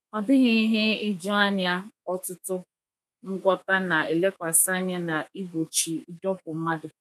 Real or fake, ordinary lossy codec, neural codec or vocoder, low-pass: fake; none; codec, 32 kHz, 1.9 kbps, SNAC; 14.4 kHz